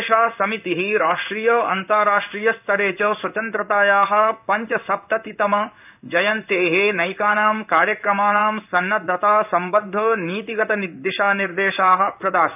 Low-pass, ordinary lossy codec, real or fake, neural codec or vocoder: 3.6 kHz; none; fake; codec, 16 kHz in and 24 kHz out, 1 kbps, XY-Tokenizer